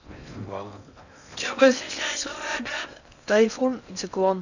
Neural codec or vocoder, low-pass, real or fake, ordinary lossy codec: codec, 16 kHz in and 24 kHz out, 0.6 kbps, FocalCodec, streaming, 4096 codes; 7.2 kHz; fake; none